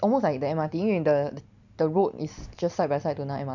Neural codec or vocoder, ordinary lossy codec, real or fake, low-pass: none; none; real; 7.2 kHz